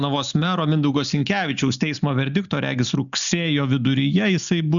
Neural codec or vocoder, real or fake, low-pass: none; real; 7.2 kHz